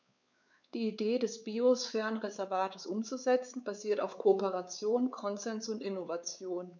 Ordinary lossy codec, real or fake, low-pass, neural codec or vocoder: none; fake; 7.2 kHz; codec, 16 kHz, 4 kbps, X-Codec, WavLM features, trained on Multilingual LibriSpeech